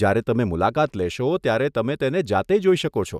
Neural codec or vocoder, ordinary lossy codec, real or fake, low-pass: none; none; real; 14.4 kHz